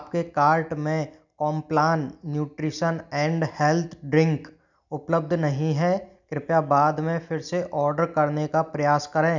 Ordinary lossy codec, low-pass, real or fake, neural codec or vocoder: none; 7.2 kHz; real; none